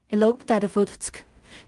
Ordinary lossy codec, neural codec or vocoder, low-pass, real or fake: Opus, 32 kbps; codec, 16 kHz in and 24 kHz out, 0.4 kbps, LongCat-Audio-Codec, two codebook decoder; 10.8 kHz; fake